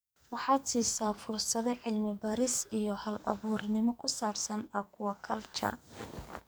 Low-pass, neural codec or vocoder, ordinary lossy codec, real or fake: none; codec, 44.1 kHz, 2.6 kbps, SNAC; none; fake